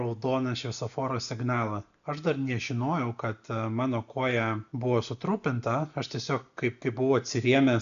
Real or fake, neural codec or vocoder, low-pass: real; none; 7.2 kHz